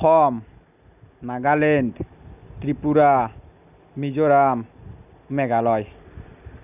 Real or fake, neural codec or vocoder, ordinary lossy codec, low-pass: real; none; none; 3.6 kHz